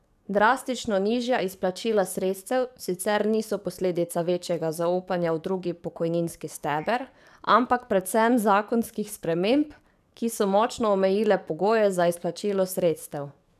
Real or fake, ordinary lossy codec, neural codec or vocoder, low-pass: fake; none; codec, 44.1 kHz, 7.8 kbps, DAC; 14.4 kHz